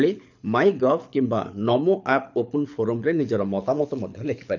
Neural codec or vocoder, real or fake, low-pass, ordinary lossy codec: codec, 24 kHz, 6 kbps, HILCodec; fake; 7.2 kHz; none